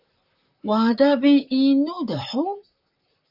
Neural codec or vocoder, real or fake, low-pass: vocoder, 44.1 kHz, 128 mel bands, Pupu-Vocoder; fake; 5.4 kHz